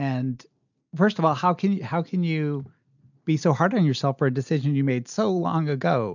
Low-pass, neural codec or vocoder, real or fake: 7.2 kHz; none; real